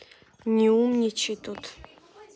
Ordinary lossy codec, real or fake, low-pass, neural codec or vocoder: none; real; none; none